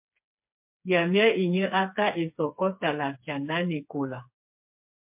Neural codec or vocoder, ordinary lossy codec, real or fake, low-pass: codec, 16 kHz, 4 kbps, FreqCodec, smaller model; MP3, 32 kbps; fake; 3.6 kHz